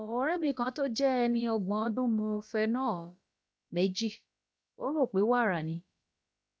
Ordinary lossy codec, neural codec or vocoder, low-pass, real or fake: none; codec, 16 kHz, about 1 kbps, DyCAST, with the encoder's durations; none; fake